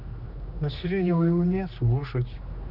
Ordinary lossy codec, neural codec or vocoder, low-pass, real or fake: none; codec, 16 kHz, 2 kbps, X-Codec, HuBERT features, trained on general audio; 5.4 kHz; fake